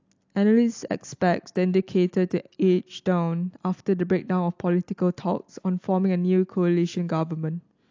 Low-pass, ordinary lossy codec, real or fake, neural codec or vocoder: 7.2 kHz; AAC, 48 kbps; real; none